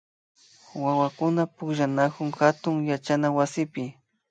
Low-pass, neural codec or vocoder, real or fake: 9.9 kHz; none; real